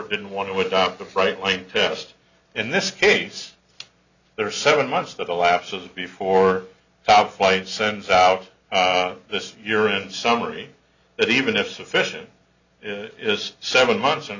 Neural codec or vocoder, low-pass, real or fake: none; 7.2 kHz; real